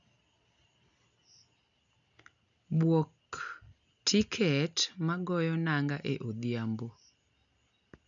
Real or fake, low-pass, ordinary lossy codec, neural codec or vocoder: real; 7.2 kHz; none; none